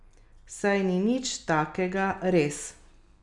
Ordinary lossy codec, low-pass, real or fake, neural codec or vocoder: none; 10.8 kHz; real; none